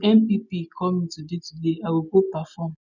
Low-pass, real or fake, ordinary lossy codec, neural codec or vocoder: 7.2 kHz; real; none; none